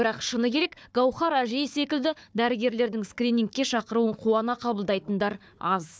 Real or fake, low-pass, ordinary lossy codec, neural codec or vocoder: fake; none; none; codec, 16 kHz, 4 kbps, FunCodec, trained on Chinese and English, 50 frames a second